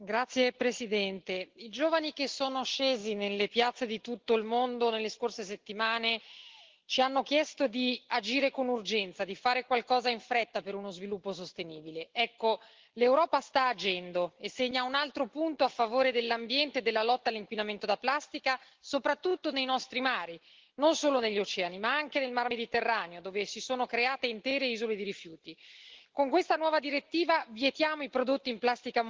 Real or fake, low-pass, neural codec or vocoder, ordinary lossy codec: real; 7.2 kHz; none; Opus, 16 kbps